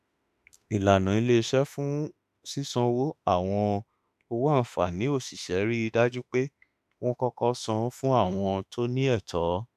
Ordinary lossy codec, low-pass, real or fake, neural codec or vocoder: none; 14.4 kHz; fake; autoencoder, 48 kHz, 32 numbers a frame, DAC-VAE, trained on Japanese speech